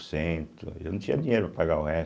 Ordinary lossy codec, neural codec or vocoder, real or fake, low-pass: none; none; real; none